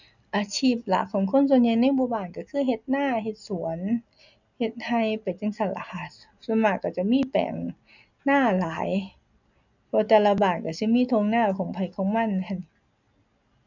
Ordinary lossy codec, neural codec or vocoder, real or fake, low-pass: none; none; real; 7.2 kHz